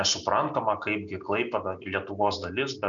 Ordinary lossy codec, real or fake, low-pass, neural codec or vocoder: MP3, 96 kbps; real; 7.2 kHz; none